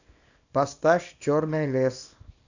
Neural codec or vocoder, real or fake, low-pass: codec, 24 kHz, 0.9 kbps, WavTokenizer, small release; fake; 7.2 kHz